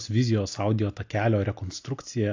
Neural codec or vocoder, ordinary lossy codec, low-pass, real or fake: none; AAC, 48 kbps; 7.2 kHz; real